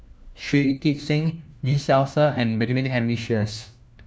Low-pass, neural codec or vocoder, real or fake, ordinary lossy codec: none; codec, 16 kHz, 1 kbps, FunCodec, trained on LibriTTS, 50 frames a second; fake; none